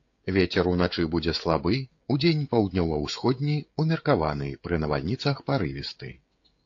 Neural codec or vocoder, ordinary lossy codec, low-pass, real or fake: codec, 16 kHz, 16 kbps, FreqCodec, smaller model; AAC, 48 kbps; 7.2 kHz; fake